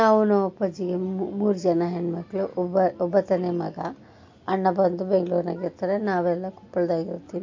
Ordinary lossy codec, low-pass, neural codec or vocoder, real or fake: MP3, 48 kbps; 7.2 kHz; none; real